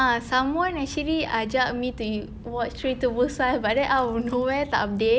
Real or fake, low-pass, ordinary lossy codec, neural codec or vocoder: real; none; none; none